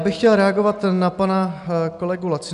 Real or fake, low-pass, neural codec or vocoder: real; 10.8 kHz; none